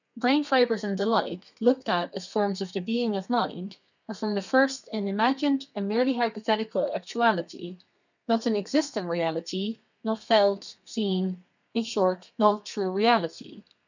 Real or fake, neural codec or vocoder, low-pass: fake; codec, 32 kHz, 1.9 kbps, SNAC; 7.2 kHz